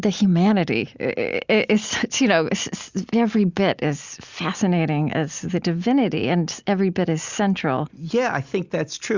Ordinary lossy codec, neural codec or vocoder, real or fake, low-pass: Opus, 64 kbps; none; real; 7.2 kHz